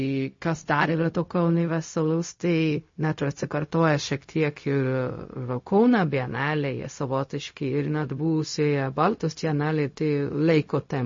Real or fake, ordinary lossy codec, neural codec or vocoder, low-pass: fake; MP3, 32 kbps; codec, 16 kHz, 0.4 kbps, LongCat-Audio-Codec; 7.2 kHz